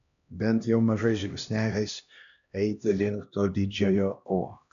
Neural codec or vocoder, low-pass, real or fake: codec, 16 kHz, 1 kbps, X-Codec, HuBERT features, trained on LibriSpeech; 7.2 kHz; fake